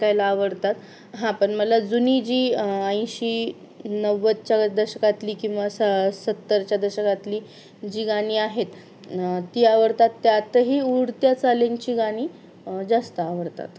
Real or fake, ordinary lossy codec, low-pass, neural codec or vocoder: real; none; none; none